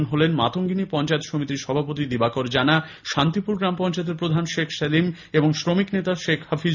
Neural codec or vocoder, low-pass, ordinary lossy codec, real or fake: none; 7.2 kHz; none; real